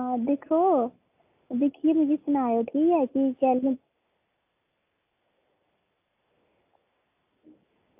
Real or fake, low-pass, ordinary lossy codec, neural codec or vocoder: real; 3.6 kHz; AAC, 32 kbps; none